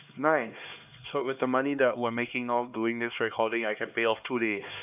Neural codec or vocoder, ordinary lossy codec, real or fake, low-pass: codec, 16 kHz, 2 kbps, X-Codec, HuBERT features, trained on LibriSpeech; none; fake; 3.6 kHz